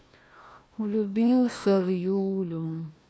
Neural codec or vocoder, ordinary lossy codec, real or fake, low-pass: codec, 16 kHz, 1 kbps, FunCodec, trained on Chinese and English, 50 frames a second; none; fake; none